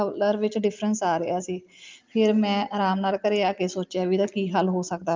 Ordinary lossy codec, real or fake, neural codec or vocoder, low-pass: Opus, 24 kbps; real; none; 7.2 kHz